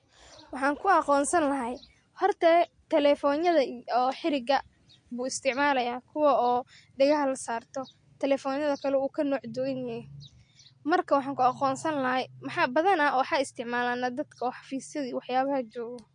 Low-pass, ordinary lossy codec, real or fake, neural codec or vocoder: 9.9 kHz; MP3, 48 kbps; real; none